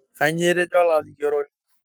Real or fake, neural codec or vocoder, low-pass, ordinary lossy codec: fake; codec, 44.1 kHz, 7.8 kbps, Pupu-Codec; none; none